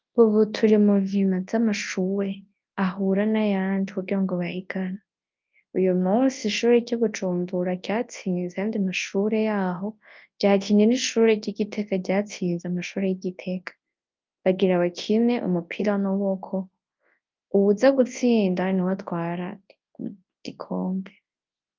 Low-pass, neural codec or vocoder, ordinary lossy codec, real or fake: 7.2 kHz; codec, 24 kHz, 0.9 kbps, WavTokenizer, large speech release; Opus, 24 kbps; fake